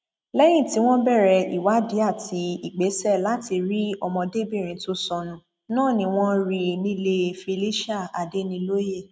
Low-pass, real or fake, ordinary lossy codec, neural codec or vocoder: none; real; none; none